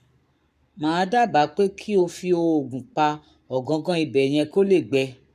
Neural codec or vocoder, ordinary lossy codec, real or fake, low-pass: codec, 44.1 kHz, 7.8 kbps, Pupu-Codec; none; fake; 14.4 kHz